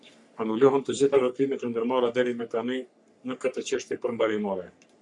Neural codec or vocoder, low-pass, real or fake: codec, 44.1 kHz, 3.4 kbps, Pupu-Codec; 10.8 kHz; fake